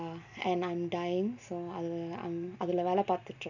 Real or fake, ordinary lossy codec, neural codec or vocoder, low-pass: real; none; none; 7.2 kHz